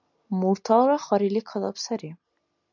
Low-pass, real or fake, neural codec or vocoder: 7.2 kHz; real; none